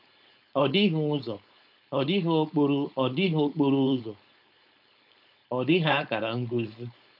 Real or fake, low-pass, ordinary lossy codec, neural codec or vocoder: fake; 5.4 kHz; none; codec, 16 kHz, 4.8 kbps, FACodec